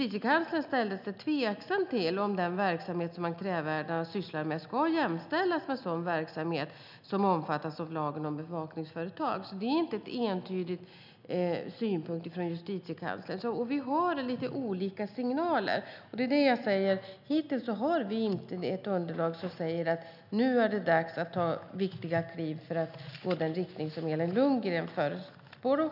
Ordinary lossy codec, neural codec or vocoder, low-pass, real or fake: none; none; 5.4 kHz; real